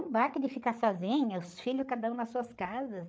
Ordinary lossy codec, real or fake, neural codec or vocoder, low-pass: none; fake; codec, 16 kHz, 8 kbps, FreqCodec, larger model; none